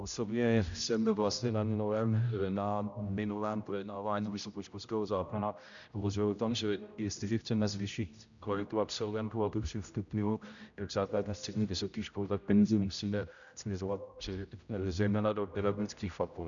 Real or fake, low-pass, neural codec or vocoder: fake; 7.2 kHz; codec, 16 kHz, 0.5 kbps, X-Codec, HuBERT features, trained on general audio